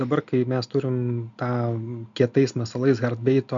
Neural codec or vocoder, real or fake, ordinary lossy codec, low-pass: none; real; MP3, 64 kbps; 7.2 kHz